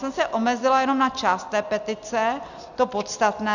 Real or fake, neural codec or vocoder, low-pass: real; none; 7.2 kHz